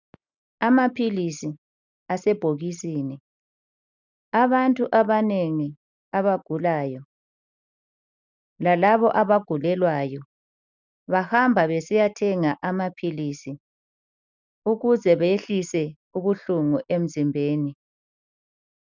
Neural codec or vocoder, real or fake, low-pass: none; real; 7.2 kHz